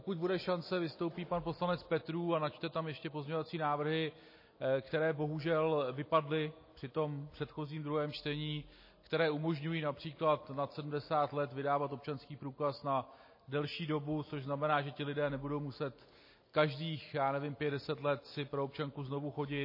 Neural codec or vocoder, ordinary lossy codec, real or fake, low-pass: none; MP3, 24 kbps; real; 5.4 kHz